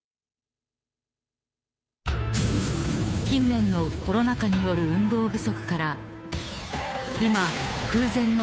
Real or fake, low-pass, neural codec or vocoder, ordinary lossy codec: fake; none; codec, 16 kHz, 2 kbps, FunCodec, trained on Chinese and English, 25 frames a second; none